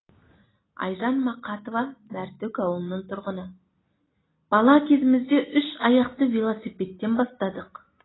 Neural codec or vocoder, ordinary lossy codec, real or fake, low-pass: none; AAC, 16 kbps; real; 7.2 kHz